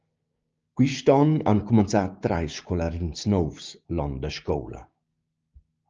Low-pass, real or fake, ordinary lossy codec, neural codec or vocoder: 7.2 kHz; fake; Opus, 24 kbps; codec, 16 kHz, 6 kbps, DAC